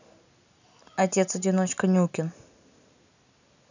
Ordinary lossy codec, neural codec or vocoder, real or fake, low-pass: none; none; real; 7.2 kHz